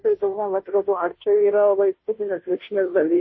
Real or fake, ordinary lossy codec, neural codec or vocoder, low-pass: fake; MP3, 24 kbps; codec, 16 kHz, 0.5 kbps, FunCodec, trained on Chinese and English, 25 frames a second; 7.2 kHz